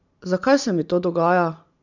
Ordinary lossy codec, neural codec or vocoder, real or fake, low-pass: none; none; real; 7.2 kHz